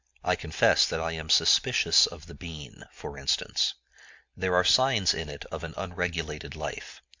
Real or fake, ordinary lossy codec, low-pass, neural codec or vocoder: real; MP3, 64 kbps; 7.2 kHz; none